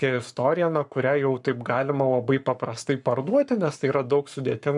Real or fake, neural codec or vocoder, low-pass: fake; codec, 44.1 kHz, 7.8 kbps, Pupu-Codec; 10.8 kHz